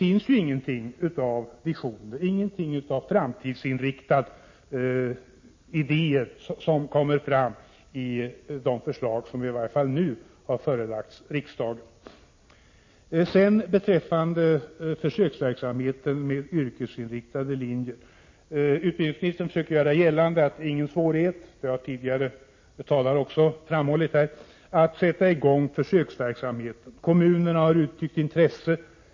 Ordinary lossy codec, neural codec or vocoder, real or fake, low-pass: MP3, 32 kbps; none; real; 7.2 kHz